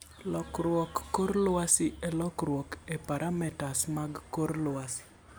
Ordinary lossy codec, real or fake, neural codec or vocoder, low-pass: none; real; none; none